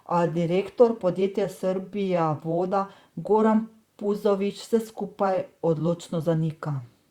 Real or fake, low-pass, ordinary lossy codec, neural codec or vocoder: fake; 19.8 kHz; Opus, 64 kbps; vocoder, 44.1 kHz, 128 mel bands, Pupu-Vocoder